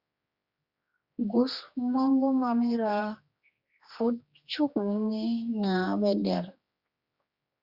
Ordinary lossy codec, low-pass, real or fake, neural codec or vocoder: Opus, 64 kbps; 5.4 kHz; fake; codec, 16 kHz, 2 kbps, X-Codec, HuBERT features, trained on general audio